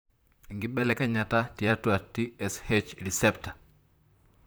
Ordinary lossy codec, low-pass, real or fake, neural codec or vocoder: none; none; fake; vocoder, 44.1 kHz, 128 mel bands, Pupu-Vocoder